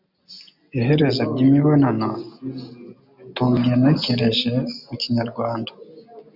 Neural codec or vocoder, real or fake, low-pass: none; real; 5.4 kHz